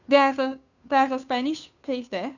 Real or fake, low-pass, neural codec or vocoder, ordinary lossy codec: fake; 7.2 kHz; codec, 16 kHz, 2 kbps, FunCodec, trained on Chinese and English, 25 frames a second; none